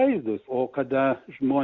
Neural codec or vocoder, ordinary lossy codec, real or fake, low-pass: none; Opus, 64 kbps; real; 7.2 kHz